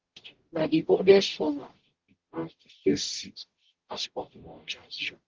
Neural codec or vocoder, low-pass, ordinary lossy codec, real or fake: codec, 44.1 kHz, 0.9 kbps, DAC; 7.2 kHz; Opus, 16 kbps; fake